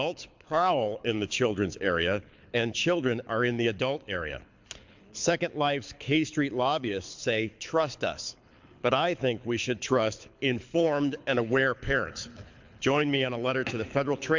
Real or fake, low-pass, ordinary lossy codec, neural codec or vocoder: fake; 7.2 kHz; MP3, 64 kbps; codec, 24 kHz, 6 kbps, HILCodec